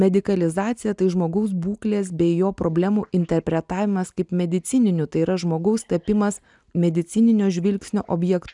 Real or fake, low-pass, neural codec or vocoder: real; 10.8 kHz; none